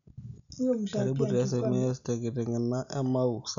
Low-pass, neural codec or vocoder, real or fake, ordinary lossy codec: 7.2 kHz; none; real; none